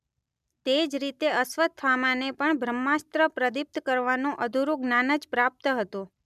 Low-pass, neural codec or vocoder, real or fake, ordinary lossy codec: 14.4 kHz; none; real; none